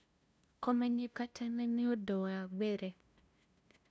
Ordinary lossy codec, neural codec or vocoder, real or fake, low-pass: none; codec, 16 kHz, 0.5 kbps, FunCodec, trained on LibriTTS, 25 frames a second; fake; none